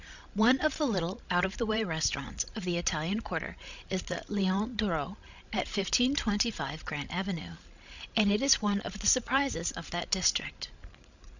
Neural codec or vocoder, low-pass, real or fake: codec, 16 kHz, 16 kbps, FreqCodec, larger model; 7.2 kHz; fake